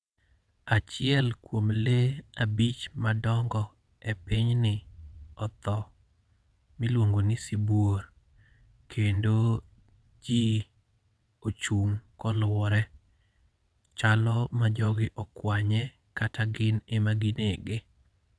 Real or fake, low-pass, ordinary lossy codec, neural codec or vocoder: fake; none; none; vocoder, 22.05 kHz, 80 mel bands, WaveNeXt